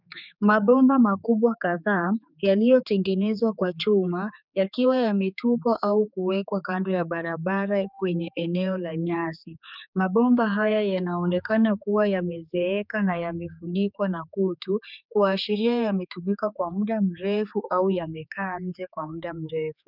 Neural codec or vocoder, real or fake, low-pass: codec, 16 kHz, 4 kbps, X-Codec, HuBERT features, trained on general audio; fake; 5.4 kHz